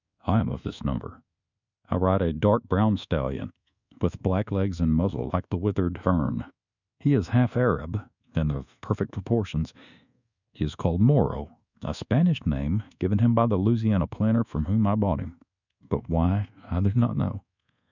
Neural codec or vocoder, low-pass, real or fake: codec, 24 kHz, 1.2 kbps, DualCodec; 7.2 kHz; fake